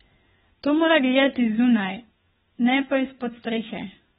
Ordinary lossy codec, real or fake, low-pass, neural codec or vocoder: AAC, 16 kbps; fake; 7.2 kHz; codec, 16 kHz, 4 kbps, FunCodec, trained on LibriTTS, 50 frames a second